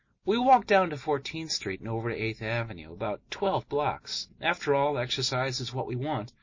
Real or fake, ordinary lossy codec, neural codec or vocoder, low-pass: real; MP3, 32 kbps; none; 7.2 kHz